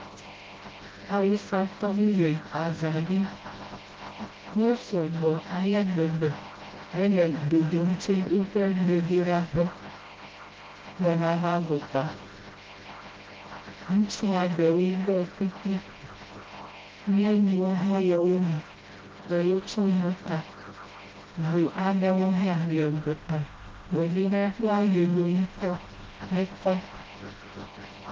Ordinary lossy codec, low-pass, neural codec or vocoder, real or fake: Opus, 32 kbps; 7.2 kHz; codec, 16 kHz, 0.5 kbps, FreqCodec, smaller model; fake